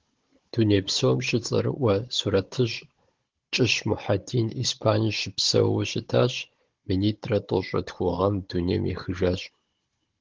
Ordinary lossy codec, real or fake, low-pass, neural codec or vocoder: Opus, 16 kbps; fake; 7.2 kHz; codec, 16 kHz, 16 kbps, FunCodec, trained on Chinese and English, 50 frames a second